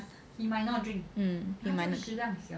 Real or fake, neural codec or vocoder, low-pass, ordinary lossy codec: real; none; none; none